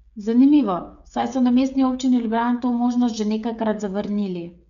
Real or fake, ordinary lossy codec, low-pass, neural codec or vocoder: fake; none; 7.2 kHz; codec, 16 kHz, 8 kbps, FreqCodec, smaller model